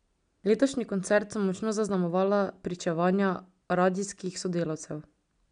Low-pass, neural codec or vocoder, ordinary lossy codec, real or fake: 9.9 kHz; none; none; real